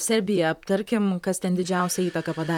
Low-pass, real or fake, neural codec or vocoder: 19.8 kHz; fake; vocoder, 44.1 kHz, 128 mel bands, Pupu-Vocoder